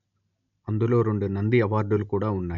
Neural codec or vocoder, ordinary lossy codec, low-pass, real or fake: none; none; 7.2 kHz; real